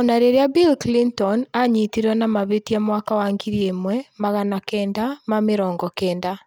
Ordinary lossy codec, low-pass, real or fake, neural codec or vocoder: none; none; real; none